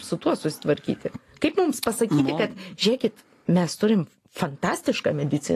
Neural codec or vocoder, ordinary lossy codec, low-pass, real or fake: none; AAC, 48 kbps; 14.4 kHz; real